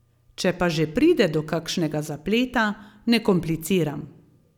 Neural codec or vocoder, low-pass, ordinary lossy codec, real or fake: none; 19.8 kHz; none; real